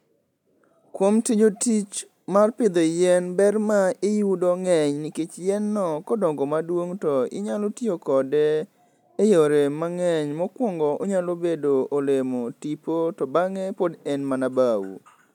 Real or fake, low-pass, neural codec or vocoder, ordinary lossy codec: real; 19.8 kHz; none; none